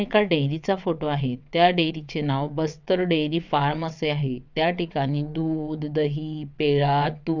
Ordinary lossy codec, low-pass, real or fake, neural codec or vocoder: none; 7.2 kHz; fake; vocoder, 22.05 kHz, 80 mel bands, WaveNeXt